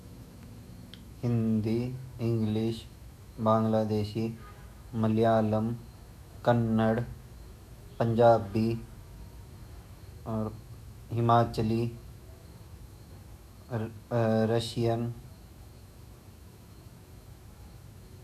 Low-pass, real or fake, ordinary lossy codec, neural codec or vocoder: 14.4 kHz; fake; none; autoencoder, 48 kHz, 128 numbers a frame, DAC-VAE, trained on Japanese speech